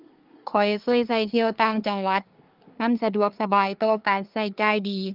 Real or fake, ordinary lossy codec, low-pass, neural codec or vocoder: fake; Opus, 32 kbps; 5.4 kHz; codec, 24 kHz, 1 kbps, SNAC